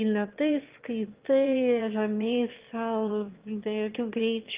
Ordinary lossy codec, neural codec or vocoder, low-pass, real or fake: Opus, 32 kbps; autoencoder, 22.05 kHz, a latent of 192 numbers a frame, VITS, trained on one speaker; 3.6 kHz; fake